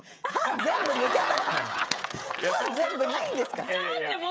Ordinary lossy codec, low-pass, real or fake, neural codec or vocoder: none; none; fake; codec, 16 kHz, 16 kbps, FreqCodec, smaller model